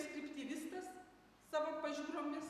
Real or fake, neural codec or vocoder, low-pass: real; none; 14.4 kHz